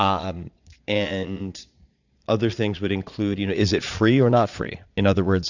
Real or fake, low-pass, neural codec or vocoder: fake; 7.2 kHz; vocoder, 22.05 kHz, 80 mel bands, Vocos